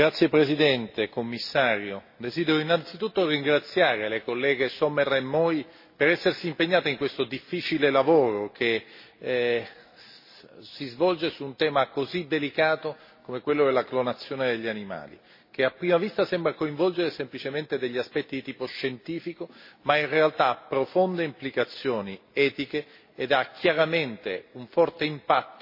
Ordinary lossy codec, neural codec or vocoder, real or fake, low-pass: MP3, 24 kbps; none; real; 5.4 kHz